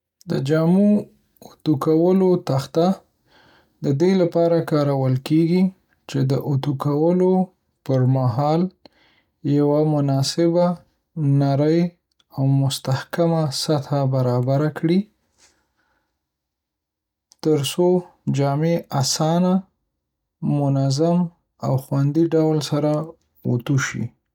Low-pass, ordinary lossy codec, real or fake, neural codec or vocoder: 19.8 kHz; none; real; none